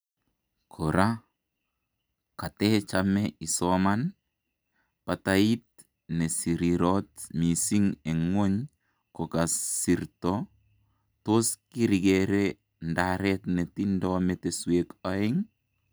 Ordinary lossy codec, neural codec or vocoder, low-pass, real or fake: none; none; none; real